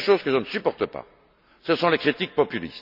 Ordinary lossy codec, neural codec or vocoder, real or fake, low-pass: none; none; real; 5.4 kHz